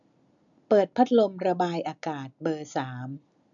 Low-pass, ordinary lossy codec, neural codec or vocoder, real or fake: 7.2 kHz; none; none; real